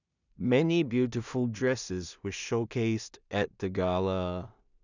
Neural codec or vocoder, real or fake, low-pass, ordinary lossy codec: codec, 16 kHz in and 24 kHz out, 0.4 kbps, LongCat-Audio-Codec, two codebook decoder; fake; 7.2 kHz; none